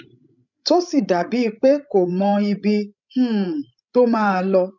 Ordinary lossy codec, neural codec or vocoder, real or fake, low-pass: none; codec, 16 kHz, 16 kbps, FreqCodec, larger model; fake; 7.2 kHz